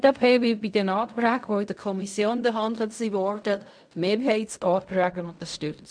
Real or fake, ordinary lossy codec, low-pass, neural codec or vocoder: fake; none; 9.9 kHz; codec, 16 kHz in and 24 kHz out, 0.4 kbps, LongCat-Audio-Codec, fine tuned four codebook decoder